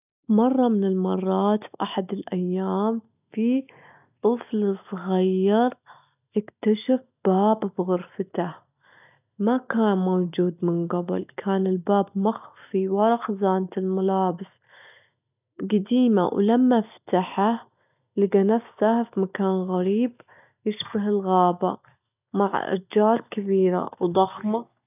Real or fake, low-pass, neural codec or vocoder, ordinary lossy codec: real; 3.6 kHz; none; none